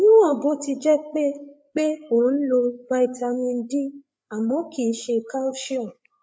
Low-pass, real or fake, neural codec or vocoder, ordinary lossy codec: none; fake; codec, 16 kHz, 8 kbps, FreqCodec, larger model; none